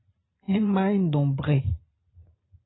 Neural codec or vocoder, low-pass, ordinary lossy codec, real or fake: vocoder, 44.1 kHz, 128 mel bands every 512 samples, BigVGAN v2; 7.2 kHz; AAC, 16 kbps; fake